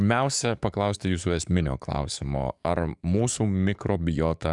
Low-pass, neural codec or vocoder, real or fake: 10.8 kHz; codec, 44.1 kHz, 7.8 kbps, DAC; fake